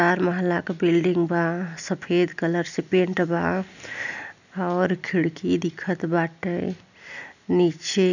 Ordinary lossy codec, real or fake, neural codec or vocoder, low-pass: none; real; none; 7.2 kHz